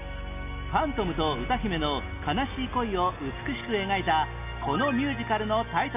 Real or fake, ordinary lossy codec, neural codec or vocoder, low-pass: real; none; none; 3.6 kHz